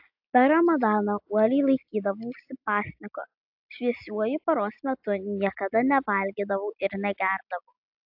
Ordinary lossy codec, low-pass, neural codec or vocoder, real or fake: AAC, 48 kbps; 5.4 kHz; none; real